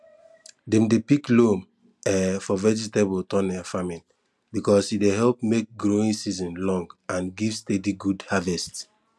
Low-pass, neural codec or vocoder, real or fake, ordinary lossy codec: none; none; real; none